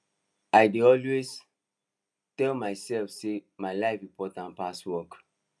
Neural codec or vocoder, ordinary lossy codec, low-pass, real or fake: none; none; none; real